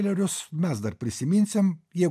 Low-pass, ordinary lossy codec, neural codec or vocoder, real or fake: 14.4 kHz; MP3, 96 kbps; none; real